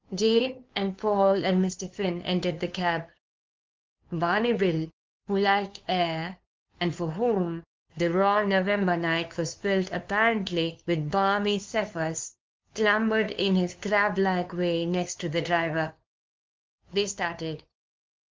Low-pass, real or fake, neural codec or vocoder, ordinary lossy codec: 7.2 kHz; fake; codec, 16 kHz, 2 kbps, FunCodec, trained on LibriTTS, 25 frames a second; Opus, 32 kbps